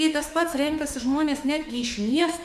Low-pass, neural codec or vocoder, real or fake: 14.4 kHz; autoencoder, 48 kHz, 32 numbers a frame, DAC-VAE, trained on Japanese speech; fake